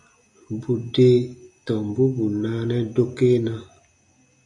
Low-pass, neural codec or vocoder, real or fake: 10.8 kHz; none; real